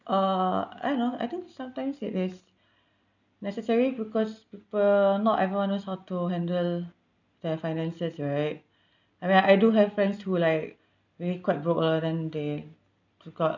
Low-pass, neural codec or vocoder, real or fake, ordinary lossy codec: 7.2 kHz; none; real; none